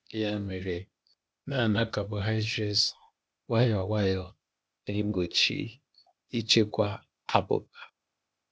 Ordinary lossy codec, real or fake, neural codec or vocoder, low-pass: none; fake; codec, 16 kHz, 0.8 kbps, ZipCodec; none